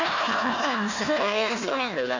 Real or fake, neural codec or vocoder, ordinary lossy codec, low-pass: fake; codec, 16 kHz, 1 kbps, FunCodec, trained on Chinese and English, 50 frames a second; AAC, 48 kbps; 7.2 kHz